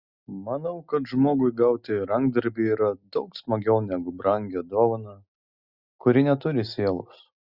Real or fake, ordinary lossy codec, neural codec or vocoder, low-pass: real; Opus, 64 kbps; none; 5.4 kHz